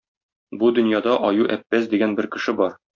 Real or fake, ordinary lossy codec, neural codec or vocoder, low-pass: real; MP3, 48 kbps; none; 7.2 kHz